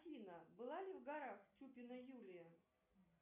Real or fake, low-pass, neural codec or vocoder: real; 3.6 kHz; none